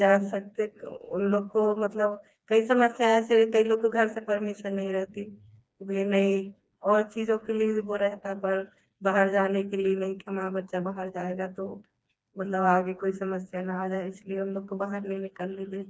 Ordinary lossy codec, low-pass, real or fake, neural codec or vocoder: none; none; fake; codec, 16 kHz, 2 kbps, FreqCodec, smaller model